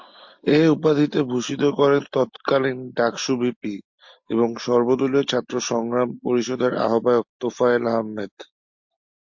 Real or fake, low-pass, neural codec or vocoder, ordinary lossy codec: real; 7.2 kHz; none; MP3, 48 kbps